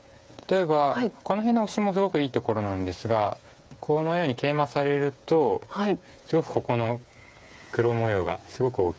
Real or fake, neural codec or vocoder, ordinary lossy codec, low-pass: fake; codec, 16 kHz, 8 kbps, FreqCodec, smaller model; none; none